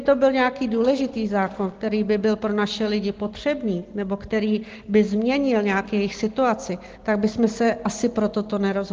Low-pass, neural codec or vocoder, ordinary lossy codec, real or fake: 7.2 kHz; none; Opus, 16 kbps; real